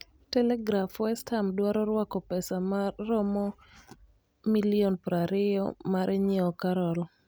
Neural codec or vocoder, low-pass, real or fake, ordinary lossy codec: none; none; real; none